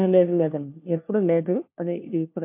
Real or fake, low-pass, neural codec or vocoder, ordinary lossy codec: fake; 3.6 kHz; codec, 16 kHz, 1 kbps, FunCodec, trained on LibriTTS, 50 frames a second; MP3, 32 kbps